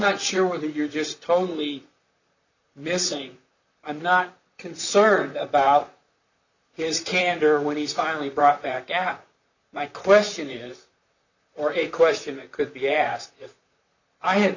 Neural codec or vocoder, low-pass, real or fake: vocoder, 44.1 kHz, 128 mel bands, Pupu-Vocoder; 7.2 kHz; fake